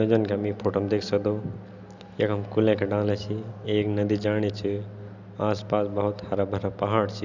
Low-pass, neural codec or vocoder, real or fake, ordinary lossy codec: 7.2 kHz; none; real; none